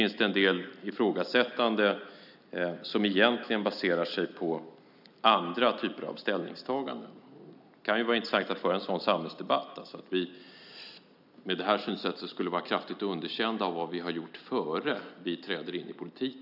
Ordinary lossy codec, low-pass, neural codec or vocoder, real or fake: none; 5.4 kHz; none; real